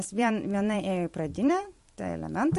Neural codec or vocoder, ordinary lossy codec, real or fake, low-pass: vocoder, 44.1 kHz, 128 mel bands every 512 samples, BigVGAN v2; MP3, 48 kbps; fake; 14.4 kHz